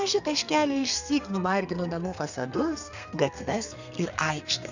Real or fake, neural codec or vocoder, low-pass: fake; codec, 32 kHz, 1.9 kbps, SNAC; 7.2 kHz